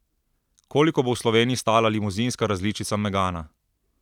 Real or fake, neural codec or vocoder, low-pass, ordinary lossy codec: fake; vocoder, 44.1 kHz, 128 mel bands every 256 samples, BigVGAN v2; 19.8 kHz; none